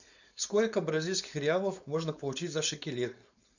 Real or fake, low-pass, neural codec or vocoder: fake; 7.2 kHz; codec, 16 kHz, 4.8 kbps, FACodec